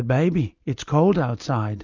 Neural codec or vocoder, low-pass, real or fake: none; 7.2 kHz; real